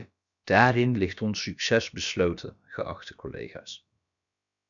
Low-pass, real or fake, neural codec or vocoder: 7.2 kHz; fake; codec, 16 kHz, about 1 kbps, DyCAST, with the encoder's durations